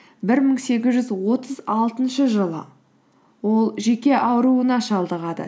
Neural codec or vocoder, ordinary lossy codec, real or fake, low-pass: none; none; real; none